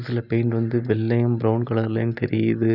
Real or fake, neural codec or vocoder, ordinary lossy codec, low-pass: real; none; none; 5.4 kHz